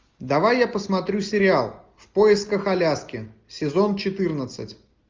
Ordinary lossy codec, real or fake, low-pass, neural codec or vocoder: Opus, 24 kbps; real; 7.2 kHz; none